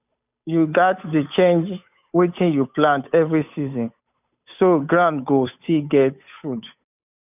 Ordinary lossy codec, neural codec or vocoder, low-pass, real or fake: none; codec, 16 kHz, 8 kbps, FunCodec, trained on Chinese and English, 25 frames a second; 3.6 kHz; fake